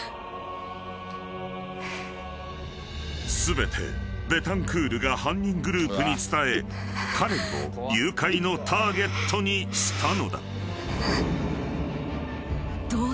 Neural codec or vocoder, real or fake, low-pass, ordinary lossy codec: none; real; none; none